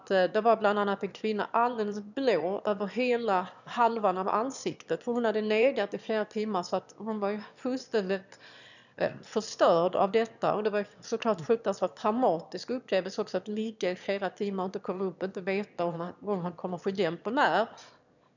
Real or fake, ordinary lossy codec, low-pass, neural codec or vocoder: fake; none; 7.2 kHz; autoencoder, 22.05 kHz, a latent of 192 numbers a frame, VITS, trained on one speaker